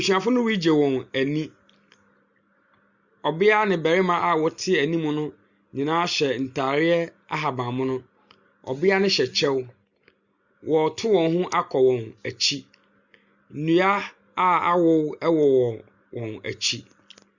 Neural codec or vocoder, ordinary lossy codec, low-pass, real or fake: none; Opus, 64 kbps; 7.2 kHz; real